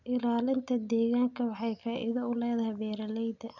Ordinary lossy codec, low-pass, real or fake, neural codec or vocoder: none; 7.2 kHz; real; none